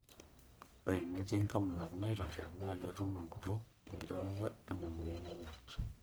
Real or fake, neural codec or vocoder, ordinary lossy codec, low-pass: fake; codec, 44.1 kHz, 1.7 kbps, Pupu-Codec; none; none